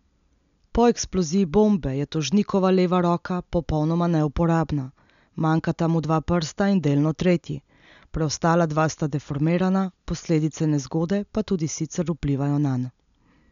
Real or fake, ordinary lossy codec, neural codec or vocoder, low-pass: real; none; none; 7.2 kHz